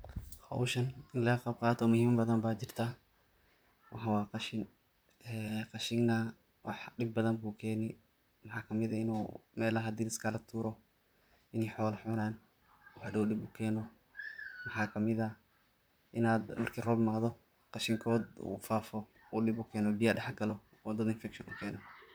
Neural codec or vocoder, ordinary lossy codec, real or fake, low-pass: vocoder, 44.1 kHz, 128 mel bands, Pupu-Vocoder; none; fake; none